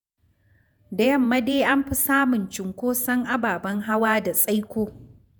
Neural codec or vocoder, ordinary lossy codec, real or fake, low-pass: vocoder, 48 kHz, 128 mel bands, Vocos; none; fake; none